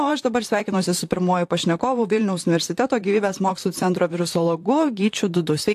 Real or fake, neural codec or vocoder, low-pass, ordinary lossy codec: fake; vocoder, 44.1 kHz, 128 mel bands, Pupu-Vocoder; 14.4 kHz; AAC, 64 kbps